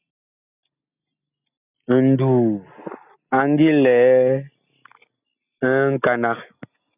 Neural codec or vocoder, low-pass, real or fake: none; 3.6 kHz; real